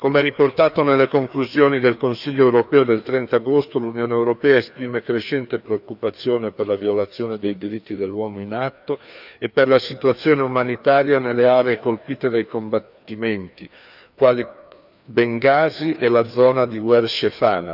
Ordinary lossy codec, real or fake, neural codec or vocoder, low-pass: none; fake; codec, 16 kHz, 2 kbps, FreqCodec, larger model; 5.4 kHz